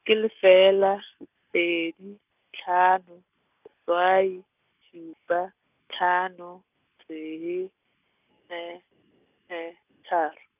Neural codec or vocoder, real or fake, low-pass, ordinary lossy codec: none; real; 3.6 kHz; none